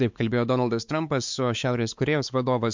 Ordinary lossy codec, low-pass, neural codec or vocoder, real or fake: MP3, 64 kbps; 7.2 kHz; codec, 16 kHz, 4 kbps, X-Codec, WavLM features, trained on Multilingual LibriSpeech; fake